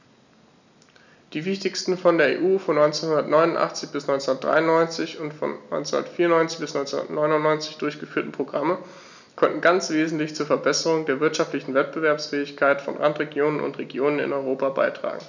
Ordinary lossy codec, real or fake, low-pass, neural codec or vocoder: none; real; 7.2 kHz; none